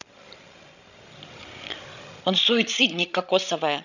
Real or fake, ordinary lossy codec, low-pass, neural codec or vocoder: fake; none; 7.2 kHz; codec, 16 kHz, 16 kbps, FreqCodec, larger model